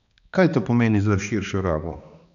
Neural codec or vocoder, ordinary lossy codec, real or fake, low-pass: codec, 16 kHz, 2 kbps, X-Codec, HuBERT features, trained on balanced general audio; none; fake; 7.2 kHz